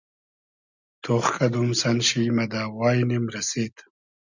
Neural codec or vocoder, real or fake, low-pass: none; real; 7.2 kHz